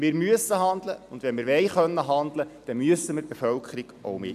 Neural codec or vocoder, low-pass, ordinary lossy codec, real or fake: none; 14.4 kHz; none; real